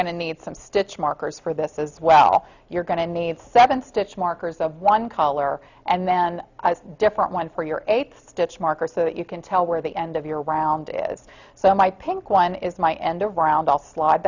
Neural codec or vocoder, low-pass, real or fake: none; 7.2 kHz; real